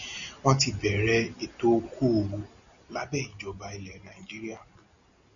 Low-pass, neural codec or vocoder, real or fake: 7.2 kHz; none; real